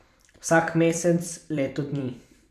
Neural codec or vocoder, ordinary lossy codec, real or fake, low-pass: vocoder, 44.1 kHz, 128 mel bands every 256 samples, BigVGAN v2; none; fake; 14.4 kHz